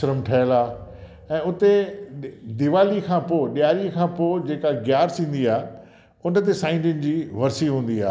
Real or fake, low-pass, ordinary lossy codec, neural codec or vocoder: real; none; none; none